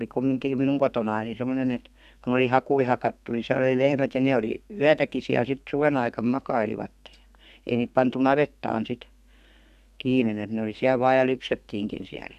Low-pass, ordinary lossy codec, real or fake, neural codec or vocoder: 14.4 kHz; none; fake; codec, 32 kHz, 1.9 kbps, SNAC